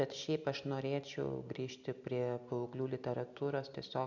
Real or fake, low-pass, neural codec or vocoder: real; 7.2 kHz; none